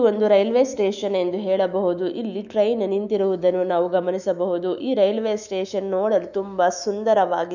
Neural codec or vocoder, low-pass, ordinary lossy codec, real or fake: autoencoder, 48 kHz, 128 numbers a frame, DAC-VAE, trained on Japanese speech; 7.2 kHz; none; fake